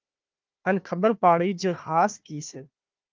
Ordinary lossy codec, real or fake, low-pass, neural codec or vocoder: Opus, 32 kbps; fake; 7.2 kHz; codec, 16 kHz, 1 kbps, FunCodec, trained on Chinese and English, 50 frames a second